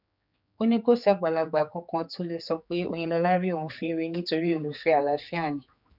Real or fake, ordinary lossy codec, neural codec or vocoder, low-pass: fake; none; codec, 16 kHz, 4 kbps, X-Codec, HuBERT features, trained on general audio; 5.4 kHz